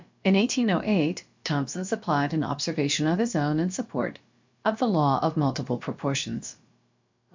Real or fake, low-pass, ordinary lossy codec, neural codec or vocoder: fake; 7.2 kHz; MP3, 64 kbps; codec, 16 kHz, about 1 kbps, DyCAST, with the encoder's durations